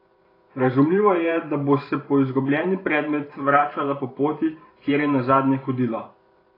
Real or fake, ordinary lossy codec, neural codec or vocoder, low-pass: fake; AAC, 24 kbps; vocoder, 44.1 kHz, 128 mel bands every 512 samples, BigVGAN v2; 5.4 kHz